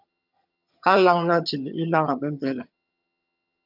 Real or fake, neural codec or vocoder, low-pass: fake; vocoder, 22.05 kHz, 80 mel bands, HiFi-GAN; 5.4 kHz